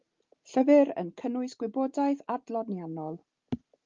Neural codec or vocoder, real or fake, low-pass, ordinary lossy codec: none; real; 7.2 kHz; Opus, 32 kbps